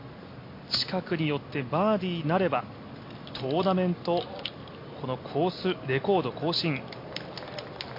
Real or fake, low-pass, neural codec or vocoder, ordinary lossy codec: real; 5.4 kHz; none; MP3, 32 kbps